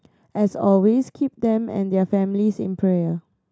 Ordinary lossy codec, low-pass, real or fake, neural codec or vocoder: none; none; real; none